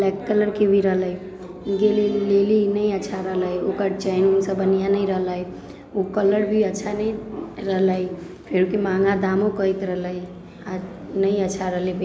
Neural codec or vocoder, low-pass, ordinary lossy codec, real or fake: none; none; none; real